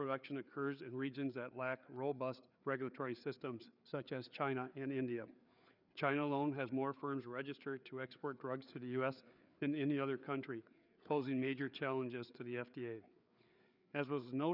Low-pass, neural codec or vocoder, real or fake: 5.4 kHz; codec, 16 kHz, 4 kbps, FreqCodec, larger model; fake